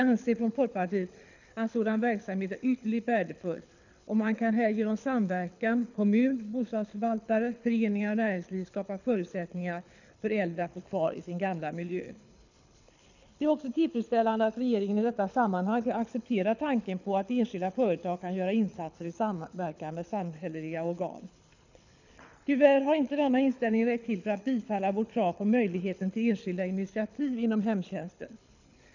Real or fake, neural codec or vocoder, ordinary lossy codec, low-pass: fake; codec, 24 kHz, 6 kbps, HILCodec; none; 7.2 kHz